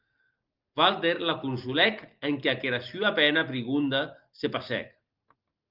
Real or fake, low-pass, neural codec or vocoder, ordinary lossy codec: real; 5.4 kHz; none; Opus, 24 kbps